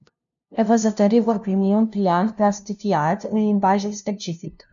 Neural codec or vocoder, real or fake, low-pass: codec, 16 kHz, 0.5 kbps, FunCodec, trained on LibriTTS, 25 frames a second; fake; 7.2 kHz